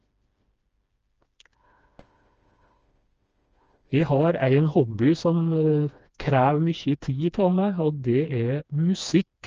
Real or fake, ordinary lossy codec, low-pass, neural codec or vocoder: fake; Opus, 24 kbps; 7.2 kHz; codec, 16 kHz, 2 kbps, FreqCodec, smaller model